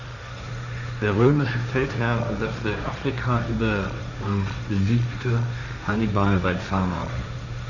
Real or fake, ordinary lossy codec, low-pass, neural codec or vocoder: fake; none; 7.2 kHz; codec, 16 kHz, 1.1 kbps, Voila-Tokenizer